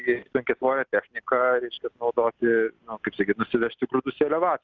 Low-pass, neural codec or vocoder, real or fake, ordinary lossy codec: 7.2 kHz; none; real; Opus, 24 kbps